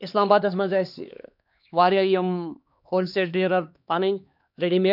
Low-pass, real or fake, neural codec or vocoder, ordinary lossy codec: 5.4 kHz; fake; codec, 16 kHz, 2 kbps, X-Codec, HuBERT features, trained on LibriSpeech; none